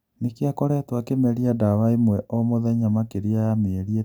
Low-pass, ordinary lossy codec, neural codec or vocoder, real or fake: none; none; none; real